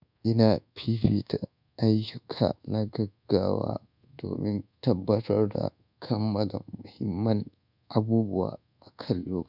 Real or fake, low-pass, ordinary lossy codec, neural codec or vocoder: fake; 5.4 kHz; none; codec, 24 kHz, 1.2 kbps, DualCodec